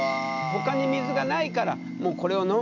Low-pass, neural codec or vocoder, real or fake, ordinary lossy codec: 7.2 kHz; none; real; none